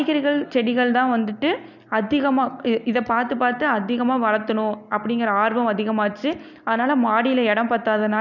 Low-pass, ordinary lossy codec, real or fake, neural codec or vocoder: 7.2 kHz; none; real; none